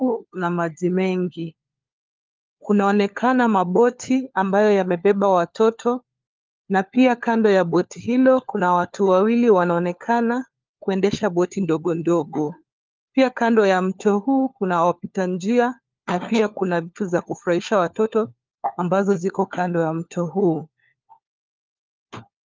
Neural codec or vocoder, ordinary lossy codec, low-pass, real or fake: codec, 16 kHz, 4 kbps, FunCodec, trained on LibriTTS, 50 frames a second; Opus, 24 kbps; 7.2 kHz; fake